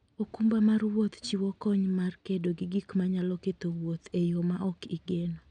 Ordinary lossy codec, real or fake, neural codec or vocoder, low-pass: none; real; none; 9.9 kHz